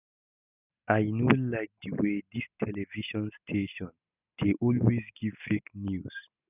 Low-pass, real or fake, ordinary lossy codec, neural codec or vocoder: 3.6 kHz; real; none; none